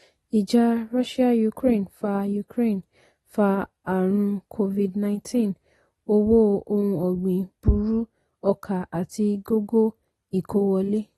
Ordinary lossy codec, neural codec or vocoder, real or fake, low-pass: AAC, 32 kbps; none; real; 19.8 kHz